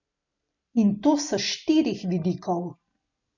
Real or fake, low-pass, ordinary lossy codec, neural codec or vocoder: real; 7.2 kHz; none; none